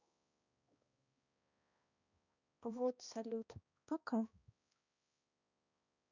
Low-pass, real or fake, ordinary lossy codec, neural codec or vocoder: 7.2 kHz; fake; none; codec, 16 kHz, 1 kbps, X-Codec, HuBERT features, trained on balanced general audio